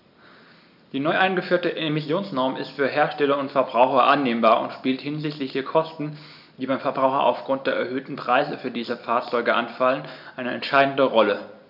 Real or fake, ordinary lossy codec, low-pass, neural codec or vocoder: real; none; 5.4 kHz; none